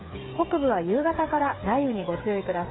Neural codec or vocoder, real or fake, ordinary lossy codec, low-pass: codec, 16 kHz, 8 kbps, FreqCodec, smaller model; fake; AAC, 16 kbps; 7.2 kHz